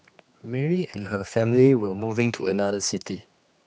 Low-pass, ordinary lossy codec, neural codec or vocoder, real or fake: none; none; codec, 16 kHz, 2 kbps, X-Codec, HuBERT features, trained on general audio; fake